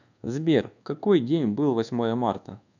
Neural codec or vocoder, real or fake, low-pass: codec, 24 kHz, 1.2 kbps, DualCodec; fake; 7.2 kHz